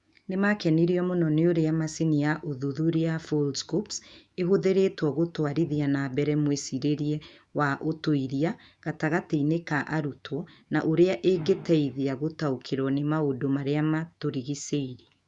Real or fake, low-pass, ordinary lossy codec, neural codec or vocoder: fake; 10.8 kHz; Opus, 64 kbps; autoencoder, 48 kHz, 128 numbers a frame, DAC-VAE, trained on Japanese speech